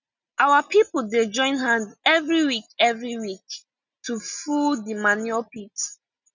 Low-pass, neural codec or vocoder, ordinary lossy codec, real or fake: 7.2 kHz; none; none; real